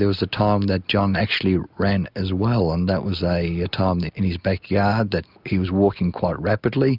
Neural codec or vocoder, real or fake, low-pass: none; real; 5.4 kHz